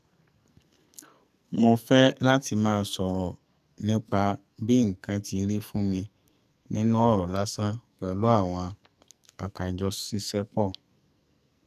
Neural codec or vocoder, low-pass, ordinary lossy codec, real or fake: codec, 44.1 kHz, 2.6 kbps, SNAC; 14.4 kHz; none; fake